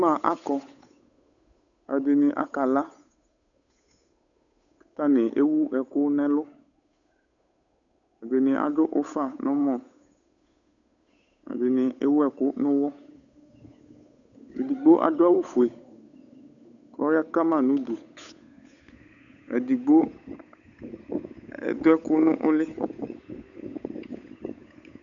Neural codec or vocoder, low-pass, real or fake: codec, 16 kHz, 8 kbps, FunCodec, trained on Chinese and English, 25 frames a second; 7.2 kHz; fake